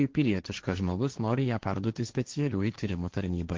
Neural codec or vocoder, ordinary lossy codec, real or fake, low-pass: codec, 16 kHz, 1.1 kbps, Voila-Tokenizer; Opus, 16 kbps; fake; 7.2 kHz